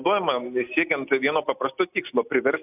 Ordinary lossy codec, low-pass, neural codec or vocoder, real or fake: AAC, 32 kbps; 3.6 kHz; none; real